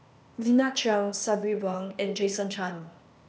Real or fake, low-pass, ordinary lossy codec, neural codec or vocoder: fake; none; none; codec, 16 kHz, 0.8 kbps, ZipCodec